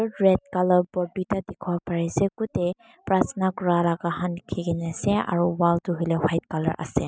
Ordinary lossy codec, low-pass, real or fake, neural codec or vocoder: none; none; real; none